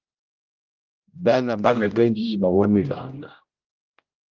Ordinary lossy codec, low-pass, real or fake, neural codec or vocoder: Opus, 32 kbps; 7.2 kHz; fake; codec, 16 kHz, 0.5 kbps, X-Codec, HuBERT features, trained on general audio